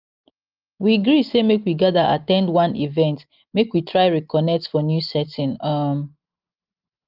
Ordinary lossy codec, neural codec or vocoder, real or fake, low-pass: Opus, 32 kbps; none; real; 5.4 kHz